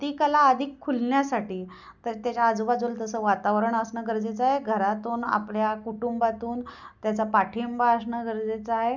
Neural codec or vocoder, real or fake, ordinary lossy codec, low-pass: none; real; none; 7.2 kHz